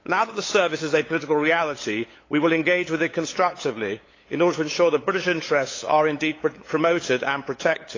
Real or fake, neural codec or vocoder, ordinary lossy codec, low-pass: fake; codec, 16 kHz, 8 kbps, FunCodec, trained on LibriTTS, 25 frames a second; AAC, 32 kbps; 7.2 kHz